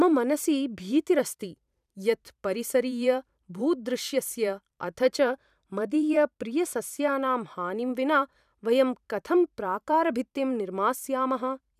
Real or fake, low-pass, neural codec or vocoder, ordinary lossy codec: fake; 14.4 kHz; vocoder, 44.1 kHz, 128 mel bands every 512 samples, BigVGAN v2; none